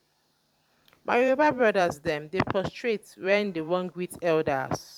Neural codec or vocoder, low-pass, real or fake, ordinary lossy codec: vocoder, 44.1 kHz, 128 mel bands every 512 samples, BigVGAN v2; 19.8 kHz; fake; none